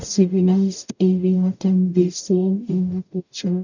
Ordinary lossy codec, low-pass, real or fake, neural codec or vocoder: none; 7.2 kHz; fake; codec, 44.1 kHz, 0.9 kbps, DAC